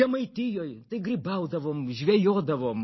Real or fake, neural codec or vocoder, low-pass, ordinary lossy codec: real; none; 7.2 kHz; MP3, 24 kbps